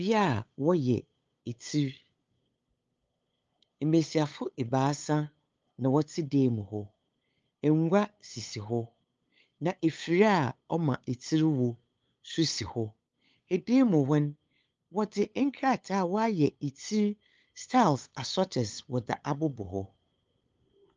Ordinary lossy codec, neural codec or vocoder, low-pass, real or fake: Opus, 24 kbps; codec, 16 kHz, 8 kbps, FunCodec, trained on LibriTTS, 25 frames a second; 7.2 kHz; fake